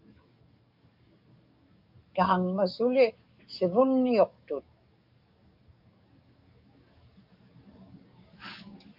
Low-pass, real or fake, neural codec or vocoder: 5.4 kHz; fake; codec, 44.1 kHz, 7.8 kbps, DAC